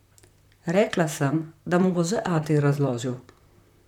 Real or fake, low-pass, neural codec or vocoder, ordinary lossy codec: fake; 19.8 kHz; vocoder, 44.1 kHz, 128 mel bands, Pupu-Vocoder; none